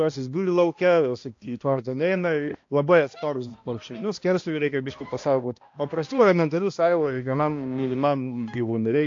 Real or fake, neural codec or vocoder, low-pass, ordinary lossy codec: fake; codec, 16 kHz, 1 kbps, X-Codec, HuBERT features, trained on balanced general audio; 7.2 kHz; AAC, 48 kbps